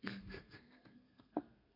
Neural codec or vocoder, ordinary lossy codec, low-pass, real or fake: codec, 32 kHz, 1.9 kbps, SNAC; none; 5.4 kHz; fake